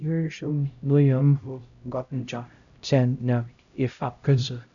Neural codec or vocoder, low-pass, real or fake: codec, 16 kHz, 0.5 kbps, X-Codec, HuBERT features, trained on LibriSpeech; 7.2 kHz; fake